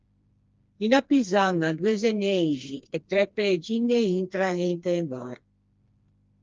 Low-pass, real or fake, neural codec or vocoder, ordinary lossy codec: 7.2 kHz; fake; codec, 16 kHz, 2 kbps, FreqCodec, smaller model; Opus, 24 kbps